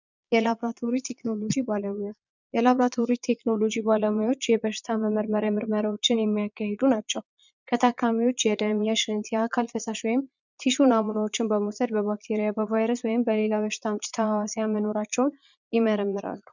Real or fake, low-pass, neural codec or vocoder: fake; 7.2 kHz; vocoder, 22.05 kHz, 80 mel bands, Vocos